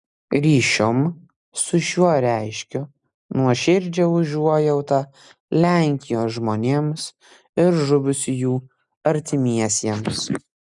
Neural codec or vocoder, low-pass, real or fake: none; 10.8 kHz; real